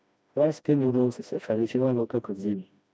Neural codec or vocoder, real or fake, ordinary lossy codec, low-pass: codec, 16 kHz, 1 kbps, FreqCodec, smaller model; fake; none; none